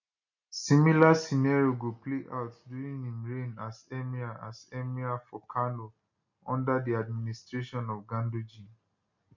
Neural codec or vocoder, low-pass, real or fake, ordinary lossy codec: none; 7.2 kHz; real; none